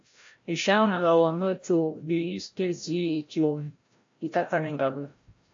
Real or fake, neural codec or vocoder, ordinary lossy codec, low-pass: fake; codec, 16 kHz, 0.5 kbps, FreqCodec, larger model; AAC, 48 kbps; 7.2 kHz